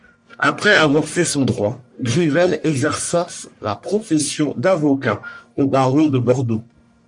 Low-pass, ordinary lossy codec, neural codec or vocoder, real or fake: 10.8 kHz; MP3, 64 kbps; codec, 44.1 kHz, 1.7 kbps, Pupu-Codec; fake